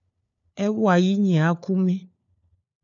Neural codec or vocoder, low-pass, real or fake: codec, 16 kHz, 4 kbps, FunCodec, trained on LibriTTS, 50 frames a second; 7.2 kHz; fake